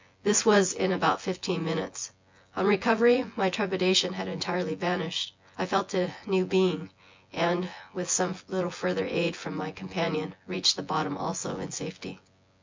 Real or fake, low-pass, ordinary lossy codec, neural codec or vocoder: fake; 7.2 kHz; MP3, 64 kbps; vocoder, 24 kHz, 100 mel bands, Vocos